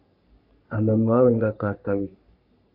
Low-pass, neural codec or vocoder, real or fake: 5.4 kHz; codec, 44.1 kHz, 3.4 kbps, Pupu-Codec; fake